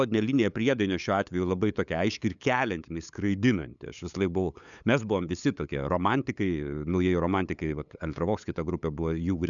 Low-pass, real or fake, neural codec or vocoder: 7.2 kHz; fake; codec, 16 kHz, 8 kbps, FunCodec, trained on LibriTTS, 25 frames a second